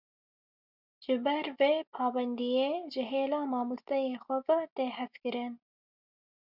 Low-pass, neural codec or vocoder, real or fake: 5.4 kHz; none; real